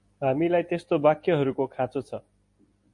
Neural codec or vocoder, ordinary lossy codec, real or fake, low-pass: none; MP3, 48 kbps; real; 10.8 kHz